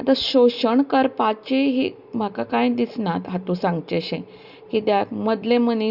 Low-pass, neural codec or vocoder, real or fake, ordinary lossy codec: 5.4 kHz; none; real; Opus, 64 kbps